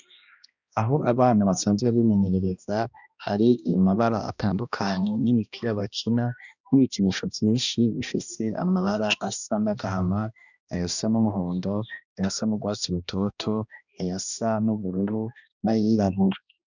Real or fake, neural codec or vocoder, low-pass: fake; codec, 16 kHz, 1 kbps, X-Codec, HuBERT features, trained on balanced general audio; 7.2 kHz